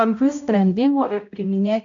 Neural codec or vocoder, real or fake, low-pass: codec, 16 kHz, 0.5 kbps, X-Codec, HuBERT features, trained on balanced general audio; fake; 7.2 kHz